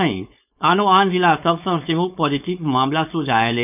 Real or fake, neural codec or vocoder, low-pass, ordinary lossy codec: fake; codec, 16 kHz, 4.8 kbps, FACodec; 3.6 kHz; none